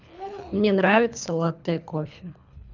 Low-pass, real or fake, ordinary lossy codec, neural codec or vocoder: 7.2 kHz; fake; none; codec, 24 kHz, 3 kbps, HILCodec